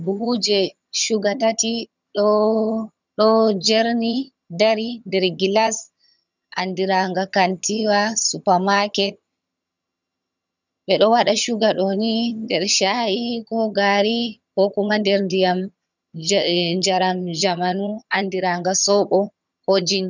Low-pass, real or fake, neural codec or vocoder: 7.2 kHz; fake; vocoder, 22.05 kHz, 80 mel bands, HiFi-GAN